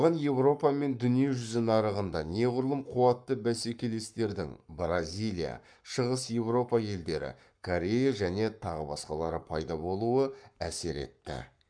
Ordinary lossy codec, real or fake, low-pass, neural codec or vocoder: none; fake; 9.9 kHz; codec, 44.1 kHz, 7.8 kbps, Pupu-Codec